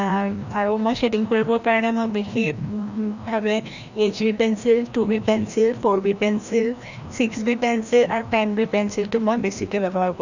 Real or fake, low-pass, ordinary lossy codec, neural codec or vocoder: fake; 7.2 kHz; none; codec, 16 kHz, 1 kbps, FreqCodec, larger model